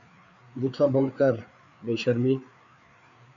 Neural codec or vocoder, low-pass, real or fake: codec, 16 kHz, 4 kbps, FreqCodec, larger model; 7.2 kHz; fake